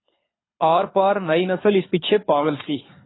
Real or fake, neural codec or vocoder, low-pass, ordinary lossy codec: fake; codec, 24 kHz, 6 kbps, HILCodec; 7.2 kHz; AAC, 16 kbps